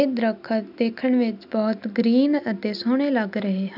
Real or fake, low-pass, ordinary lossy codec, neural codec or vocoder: real; 5.4 kHz; none; none